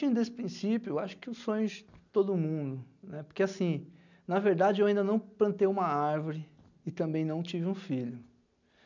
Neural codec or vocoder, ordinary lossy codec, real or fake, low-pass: none; none; real; 7.2 kHz